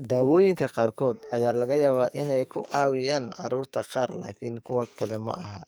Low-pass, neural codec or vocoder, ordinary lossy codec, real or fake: none; codec, 44.1 kHz, 2.6 kbps, SNAC; none; fake